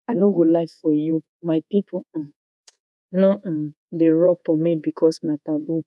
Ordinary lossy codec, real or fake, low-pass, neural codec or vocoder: none; fake; none; codec, 24 kHz, 1.2 kbps, DualCodec